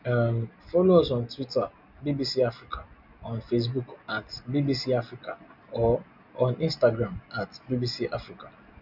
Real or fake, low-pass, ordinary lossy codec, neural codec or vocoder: real; 5.4 kHz; none; none